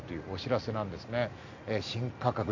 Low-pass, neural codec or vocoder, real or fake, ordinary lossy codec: 7.2 kHz; none; real; MP3, 48 kbps